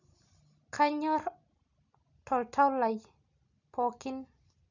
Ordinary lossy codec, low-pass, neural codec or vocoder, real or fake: none; 7.2 kHz; none; real